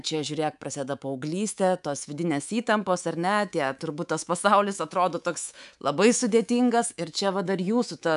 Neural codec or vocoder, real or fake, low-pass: codec, 24 kHz, 3.1 kbps, DualCodec; fake; 10.8 kHz